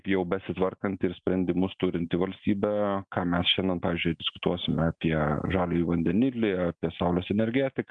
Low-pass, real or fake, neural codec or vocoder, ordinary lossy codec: 9.9 kHz; real; none; MP3, 64 kbps